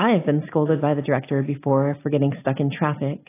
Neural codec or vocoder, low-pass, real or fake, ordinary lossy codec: none; 3.6 kHz; real; AAC, 16 kbps